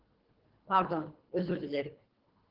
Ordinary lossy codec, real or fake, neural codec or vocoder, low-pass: Opus, 16 kbps; fake; codec, 24 kHz, 3 kbps, HILCodec; 5.4 kHz